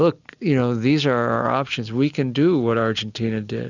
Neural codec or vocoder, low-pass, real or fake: none; 7.2 kHz; real